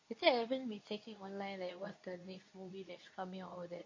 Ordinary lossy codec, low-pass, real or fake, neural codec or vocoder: MP3, 32 kbps; 7.2 kHz; fake; codec, 24 kHz, 0.9 kbps, WavTokenizer, medium speech release version 2